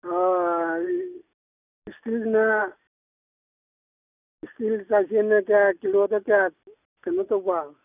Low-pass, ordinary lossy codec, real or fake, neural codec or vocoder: 3.6 kHz; none; real; none